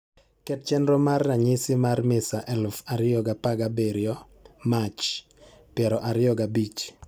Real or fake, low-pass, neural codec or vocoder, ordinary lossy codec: real; none; none; none